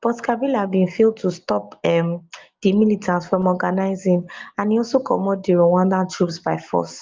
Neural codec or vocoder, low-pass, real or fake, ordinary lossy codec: none; 7.2 kHz; real; Opus, 32 kbps